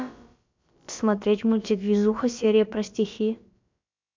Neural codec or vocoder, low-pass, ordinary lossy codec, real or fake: codec, 16 kHz, about 1 kbps, DyCAST, with the encoder's durations; 7.2 kHz; MP3, 64 kbps; fake